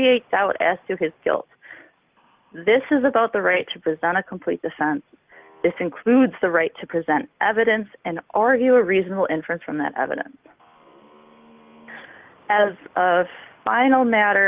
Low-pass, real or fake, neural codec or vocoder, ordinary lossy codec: 3.6 kHz; real; none; Opus, 32 kbps